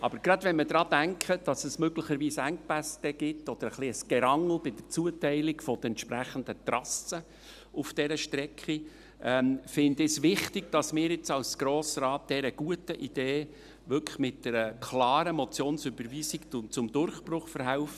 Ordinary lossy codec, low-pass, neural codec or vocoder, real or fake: none; 14.4 kHz; none; real